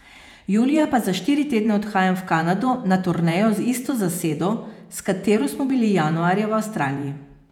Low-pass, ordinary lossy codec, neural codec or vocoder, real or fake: 19.8 kHz; none; vocoder, 48 kHz, 128 mel bands, Vocos; fake